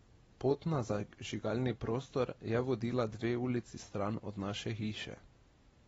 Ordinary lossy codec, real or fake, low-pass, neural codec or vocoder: AAC, 24 kbps; real; 19.8 kHz; none